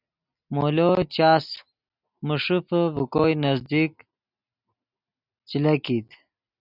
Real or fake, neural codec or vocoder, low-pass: real; none; 5.4 kHz